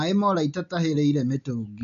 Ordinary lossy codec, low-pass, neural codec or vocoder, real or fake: none; 7.2 kHz; none; real